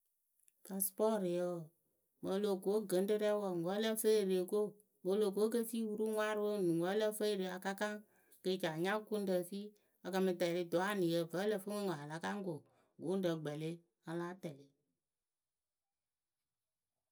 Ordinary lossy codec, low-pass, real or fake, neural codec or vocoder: none; none; real; none